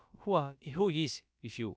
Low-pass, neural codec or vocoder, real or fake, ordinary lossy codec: none; codec, 16 kHz, about 1 kbps, DyCAST, with the encoder's durations; fake; none